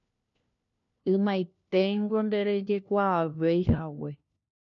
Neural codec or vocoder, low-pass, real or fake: codec, 16 kHz, 1 kbps, FunCodec, trained on LibriTTS, 50 frames a second; 7.2 kHz; fake